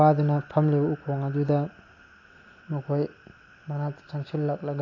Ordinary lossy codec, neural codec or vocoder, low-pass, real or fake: AAC, 32 kbps; none; 7.2 kHz; real